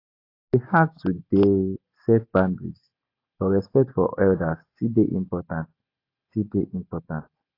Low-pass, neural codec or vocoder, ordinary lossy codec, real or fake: 5.4 kHz; none; AAC, 32 kbps; real